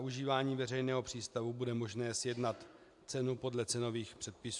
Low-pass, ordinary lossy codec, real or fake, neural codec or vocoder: 10.8 kHz; AAC, 64 kbps; real; none